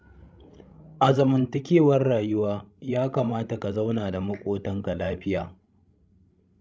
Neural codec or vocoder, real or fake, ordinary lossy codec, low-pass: codec, 16 kHz, 16 kbps, FreqCodec, larger model; fake; none; none